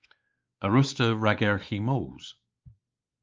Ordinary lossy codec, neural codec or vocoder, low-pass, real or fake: Opus, 24 kbps; codec, 16 kHz, 4 kbps, X-Codec, WavLM features, trained on Multilingual LibriSpeech; 7.2 kHz; fake